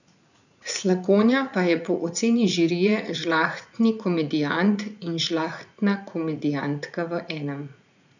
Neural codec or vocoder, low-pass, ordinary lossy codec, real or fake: vocoder, 44.1 kHz, 80 mel bands, Vocos; 7.2 kHz; none; fake